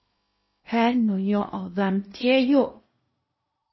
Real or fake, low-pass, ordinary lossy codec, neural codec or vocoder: fake; 7.2 kHz; MP3, 24 kbps; codec, 16 kHz in and 24 kHz out, 0.6 kbps, FocalCodec, streaming, 2048 codes